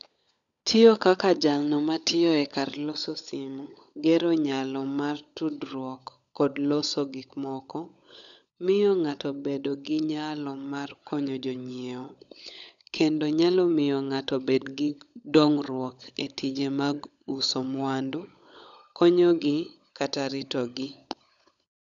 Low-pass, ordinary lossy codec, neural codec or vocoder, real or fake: 7.2 kHz; none; codec, 16 kHz, 16 kbps, FunCodec, trained on LibriTTS, 50 frames a second; fake